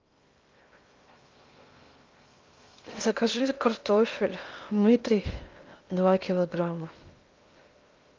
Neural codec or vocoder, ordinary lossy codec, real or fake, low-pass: codec, 16 kHz in and 24 kHz out, 0.6 kbps, FocalCodec, streaming, 2048 codes; Opus, 24 kbps; fake; 7.2 kHz